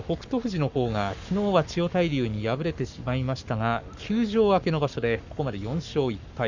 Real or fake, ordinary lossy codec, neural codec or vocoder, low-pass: fake; none; codec, 44.1 kHz, 7.8 kbps, Pupu-Codec; 7.2 kHz